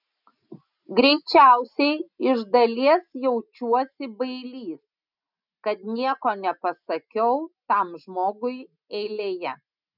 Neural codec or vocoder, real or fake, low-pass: none; real; 5.4 kHz